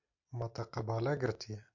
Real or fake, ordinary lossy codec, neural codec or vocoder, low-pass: real; AAC, 48 kbps; none; 7.2 kHz